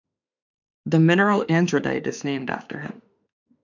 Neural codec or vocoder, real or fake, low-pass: autoencoder, 48 kHz, 32 numbers a frame, DAC-VAE, trained on Japanese speech; fake; 7.2 kHz